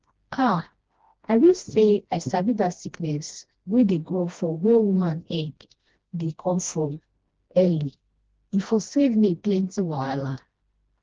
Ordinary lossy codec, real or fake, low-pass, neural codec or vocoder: Opus, 16 kbps; fake; 7.2 kHz; codec, 16 kHz, 1 kbps, FreqCodec, smaller model